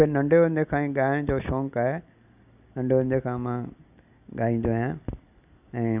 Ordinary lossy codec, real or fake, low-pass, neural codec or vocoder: none; real; 3.6 kHz; none